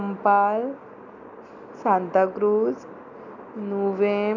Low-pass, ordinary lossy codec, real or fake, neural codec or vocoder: 7.2 kHz; none; real; none